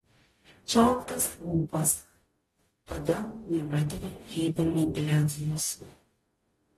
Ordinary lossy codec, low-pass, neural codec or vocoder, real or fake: AAC, 32 kbps; 19.8 kHz; codec, 44.1 kHz, 0.9 kbps, DAC; fake